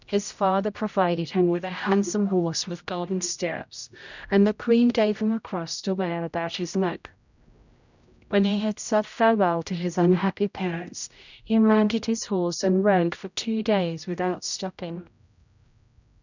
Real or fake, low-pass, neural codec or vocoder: fake; 7.2 kHz; codec, 16 kHz, 0.5 kbps, X-Codec, HuBERT features, trained on general audio